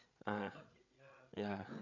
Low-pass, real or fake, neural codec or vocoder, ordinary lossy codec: 7.2 kHz; fake; codec, 16 kHz, 8 kbps, FreqCodec, larger model; none